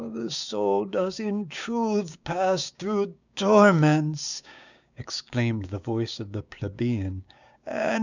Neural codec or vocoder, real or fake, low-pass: codec, 16 kHz, 6 kbps, DAC; fake; 7.2 kHz